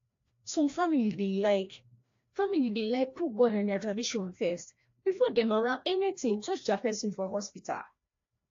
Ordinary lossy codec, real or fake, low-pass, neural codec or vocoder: AAC, 48 kbps; fake; 7.2 kHz; codec, 16 kHz, 1 kbps, FreqCodec, larger model